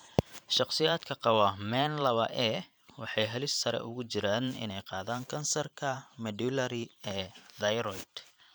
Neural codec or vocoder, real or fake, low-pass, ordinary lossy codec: vocoder, 44.1 kHz, 128 mel bands every 512 samples, BigVGAN v2; fake; none; none